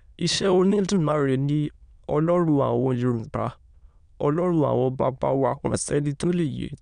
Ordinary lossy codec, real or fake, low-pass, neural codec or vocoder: none; fake; 9.9 kHz; autoencoder, 22.05 kHz, a latent of 192 numbers a frame, VITS, trained on many speakers